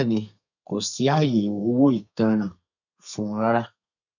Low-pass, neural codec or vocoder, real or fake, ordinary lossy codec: 7.2 kHz; codec, 32 kHz, 1.9 kbps, SNAC; fake; none